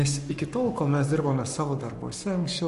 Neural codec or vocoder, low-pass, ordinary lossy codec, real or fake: codec, 44.1 kHz, 7.8 kbps, Pupu-Codec; 14.4 kHz; MP3, 48 kbps; fake